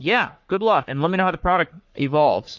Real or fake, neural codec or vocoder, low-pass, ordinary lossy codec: fake; codec, 44.1 kHz, 3.4 kbps, Pupu-Codec; 7.2 kHz; MP3, 48 kbps